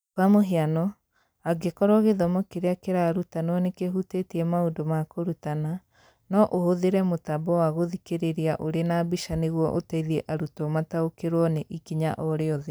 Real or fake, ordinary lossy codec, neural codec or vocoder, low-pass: real; none; none; none